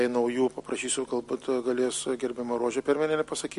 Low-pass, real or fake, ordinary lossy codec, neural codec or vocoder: 14.4 kHz; real; MP3, 48 kbps; none